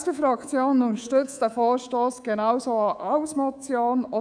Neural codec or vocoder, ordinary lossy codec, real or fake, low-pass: codec, 24 kHz, 3.1 kbps, DualCodec; none; fake; 9.9 kHz